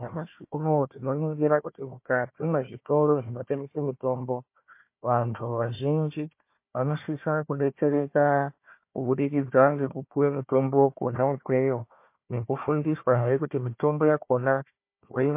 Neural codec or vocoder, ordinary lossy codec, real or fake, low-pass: codec, 16 kHz, 1 kbps, FunCodec, trained on Chinese and English, 50 frames a second; MP3, 24 kbps; fake; 3.6 kHz